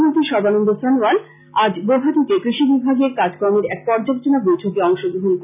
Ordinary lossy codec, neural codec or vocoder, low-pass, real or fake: none; none; 3.6 kHz; real